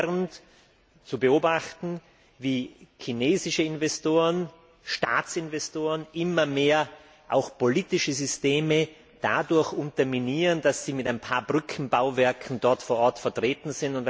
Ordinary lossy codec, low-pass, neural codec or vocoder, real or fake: none; none; none; real